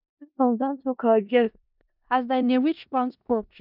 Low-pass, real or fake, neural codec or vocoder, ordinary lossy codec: 5.4 kHz; fake; codec, 16 kHz in and 24 kHz out, 0.4 kbps, LongCat-Audio-Codec, four codebook decoder; none